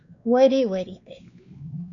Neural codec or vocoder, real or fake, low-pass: codec, 16 kHz, 2 kbps, X-Codec, WavLM features, trained on Multilingual LibriSpeech; fake; 7.2 kHz